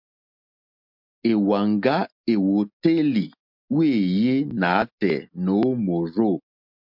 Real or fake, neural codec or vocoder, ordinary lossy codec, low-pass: real; none; MP3, 48 kbps; 5.4 kHz